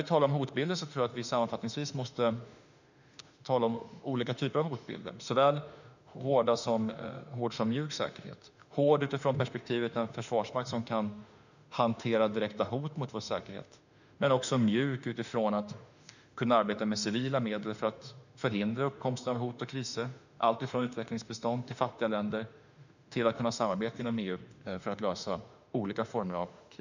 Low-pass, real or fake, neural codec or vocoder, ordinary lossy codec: 7.2 kHz; fake; autoencoder, 48 kHz, 32 numbers a frame, DAC-VAE, trained on Japanese speech; none